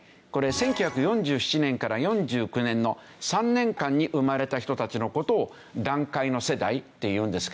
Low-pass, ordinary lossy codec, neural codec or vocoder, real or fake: none; none; none; real